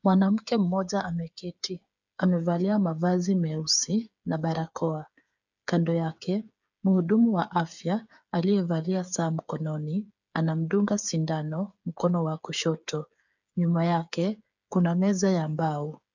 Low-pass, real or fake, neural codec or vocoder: 7.2 kHz; fake; codec, 16 kHz, 8 kbps, FreqCodec, smaller model